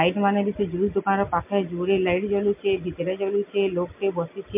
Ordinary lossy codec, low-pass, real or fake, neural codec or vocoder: MP3, 32 kbps; 3.6 kHz; real; none